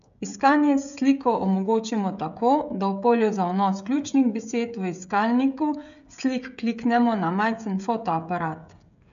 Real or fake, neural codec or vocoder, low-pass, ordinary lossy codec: fake; codec, 16 kHz, 16 kbps, FreqCodec, smaller model; 7.2 kHz; MP3, 96 kbps